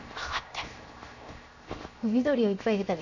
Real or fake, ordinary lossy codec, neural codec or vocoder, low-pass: fake; none; codec, 16 kHz, 0.7 kbps, FocalCodec; 7.2 kHz